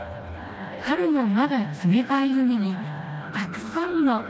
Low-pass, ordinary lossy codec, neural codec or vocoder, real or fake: none; none; codec, 16 kHz, 1 kbps, FreqCodec, smaller model; fake